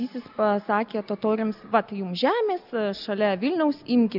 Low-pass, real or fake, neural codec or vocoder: 5.4 kHz; real; none